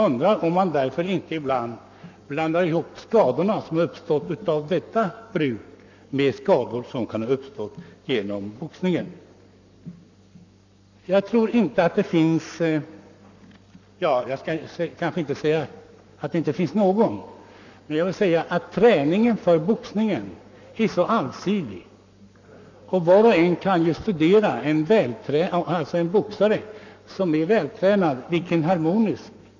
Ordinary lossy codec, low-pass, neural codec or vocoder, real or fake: none; 7.2 kHz; codec, 44.1 kHz, 7.8 kbps, Pupu-Codec; fake